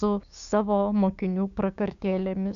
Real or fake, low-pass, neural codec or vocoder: fake; 7.2 kHz; codec, 16 kHz, 6 kbps, DAC